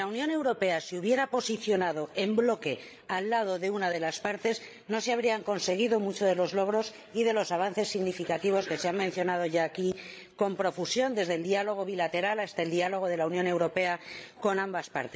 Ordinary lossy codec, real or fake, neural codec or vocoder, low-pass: none; fake; codec, 16 kHz, 16 kbps, FreqCodec, larger model; none